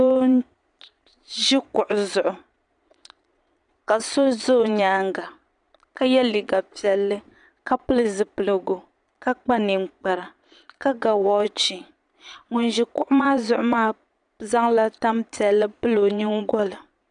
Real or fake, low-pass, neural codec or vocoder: fake; 9.9 kHz; vocoder, 22.05 kHz, 80 mel bands, Vocos